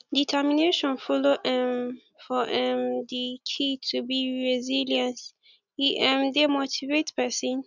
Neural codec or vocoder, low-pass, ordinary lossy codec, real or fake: none; 7.2 kHz; none; real